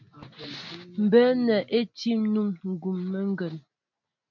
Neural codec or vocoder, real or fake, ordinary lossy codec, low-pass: none; real; MP3, 64 kbps; 7.2 kHz